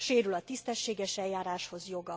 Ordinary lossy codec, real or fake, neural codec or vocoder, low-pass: none; real; none; none